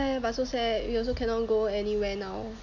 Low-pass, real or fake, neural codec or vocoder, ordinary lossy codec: 7.2 kHz; real; none; none